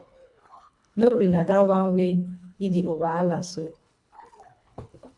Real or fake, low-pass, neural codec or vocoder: fake; 10.8 kHz; codec, 24 kHz, 1.5 kbps, HILCodec